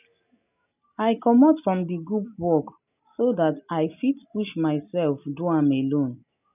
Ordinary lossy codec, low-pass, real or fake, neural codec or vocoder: none; 3.6 kHz; real; none